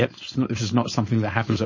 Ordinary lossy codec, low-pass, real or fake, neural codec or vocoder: MP3, 32 kbps; 7.2 kHz; fake; codec, 16 kHz, 4.8 kbps, FACodec